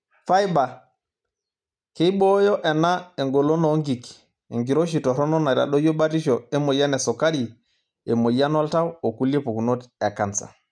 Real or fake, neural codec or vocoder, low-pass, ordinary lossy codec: real; none; 9.9 kHz; none